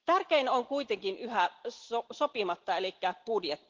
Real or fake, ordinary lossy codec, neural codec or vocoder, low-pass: real; Opus, 16 kbps; none; 7.2 kHz